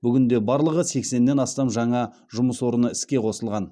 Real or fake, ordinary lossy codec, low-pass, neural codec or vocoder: real; none; none; none